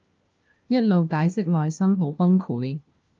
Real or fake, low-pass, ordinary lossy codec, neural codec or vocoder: fake; 7.2 kHz; Opus, 24 kbps; codec, 16 kHz, 1 kbps, FunCodec, trained on LibriTTS, 50 frames a second